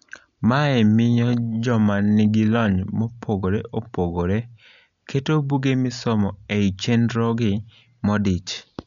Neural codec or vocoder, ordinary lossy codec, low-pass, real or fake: none; MP3, 96 kbps; 7.2 kHz; real